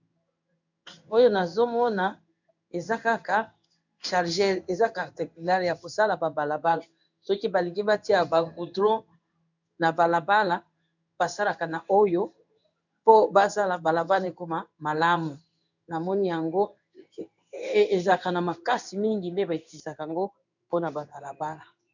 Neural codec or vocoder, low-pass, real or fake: codec, 16 kHz in and 24 kHz out, 1 kbps, XY-Tokenizer; 7.2 kHz; fake